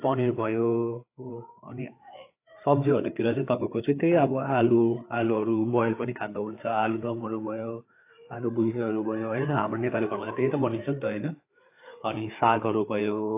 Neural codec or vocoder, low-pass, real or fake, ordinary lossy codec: codec, 16 kHz, 4 kbps, FreqCodec, larger model; 3.6 kHz; fake; AAC, 24 kbps